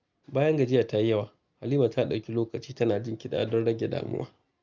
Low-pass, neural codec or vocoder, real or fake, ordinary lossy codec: 7.2 kHz; none; real; Opus, 24 kbps